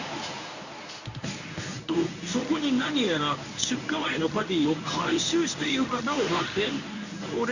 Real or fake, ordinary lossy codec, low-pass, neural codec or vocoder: fake; none; 7.2 kHz; codec, 24 kHz, 0.9 kbps, WavTokenizer, medium speech release version 1